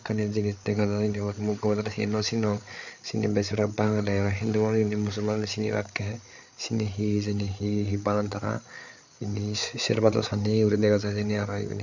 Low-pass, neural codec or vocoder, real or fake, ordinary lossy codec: 7.2 kHz; codec, 16 kHz, 8 kbps, FreqCodec, larger model; fake; none